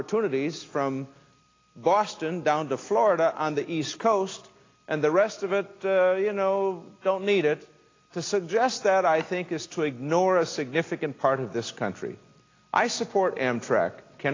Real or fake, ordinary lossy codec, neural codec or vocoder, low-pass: real; AAC, 32 kbps; none; 7.2 kHz